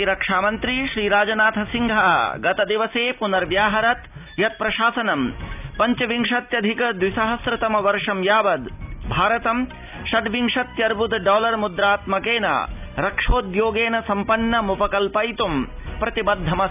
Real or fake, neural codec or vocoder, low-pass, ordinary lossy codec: real; none; 3.6 kHz; none